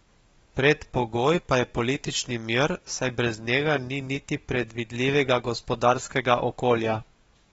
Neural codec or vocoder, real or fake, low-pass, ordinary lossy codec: codec, 44.1 kHz, 7.8 kbps, DAC; fake; 19.8 kHz; AAC, 24 kbps